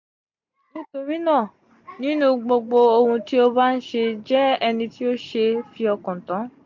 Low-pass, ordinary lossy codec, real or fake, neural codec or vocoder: 7.2 kHz; MP3, 48 kbps; real; none